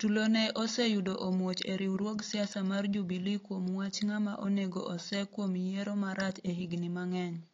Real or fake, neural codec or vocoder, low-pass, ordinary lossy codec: real; none; 7.2 kHz; AAC, 32 kbps